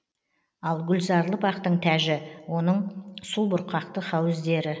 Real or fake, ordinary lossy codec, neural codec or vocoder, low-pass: real; none; none; none